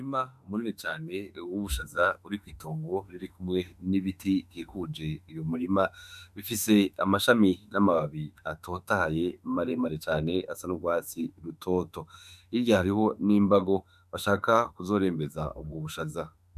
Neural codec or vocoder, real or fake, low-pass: autoencoder, 48 kHz, 32 numbers a frame, DAC-VAE, trained on Japanese speech; fake; 14.4 kHz